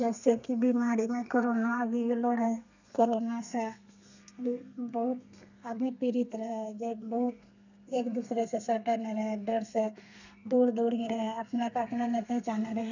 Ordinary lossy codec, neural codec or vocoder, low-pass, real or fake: none; codec, 32 kHz, 1.9 kbps, SNAC; 7.2 kHz; fake